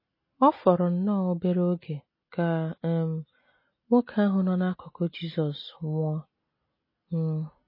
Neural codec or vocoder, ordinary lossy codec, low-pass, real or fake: none; MP3, 24 kbps; 5.4 kHz; real